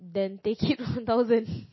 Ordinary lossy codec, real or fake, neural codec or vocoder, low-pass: MP3, 24 kbps; real; none; 7.2 kHz